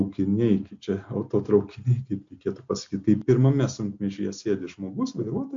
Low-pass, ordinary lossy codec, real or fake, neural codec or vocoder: 7.2 kHz; MP3, 48 kbps; real; none